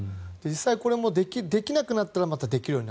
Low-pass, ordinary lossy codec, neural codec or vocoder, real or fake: none; none; none; real